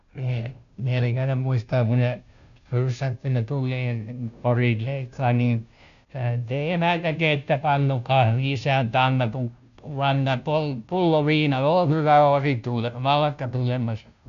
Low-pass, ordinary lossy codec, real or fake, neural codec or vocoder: 7.2 kHz; none; fake; codec, 16 kHz, 0.5 kbps, FunCodec, trained on Chinese and English, 25 frames a second